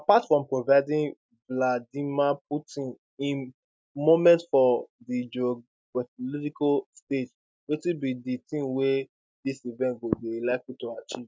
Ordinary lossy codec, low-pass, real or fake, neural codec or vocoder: none; none; real; none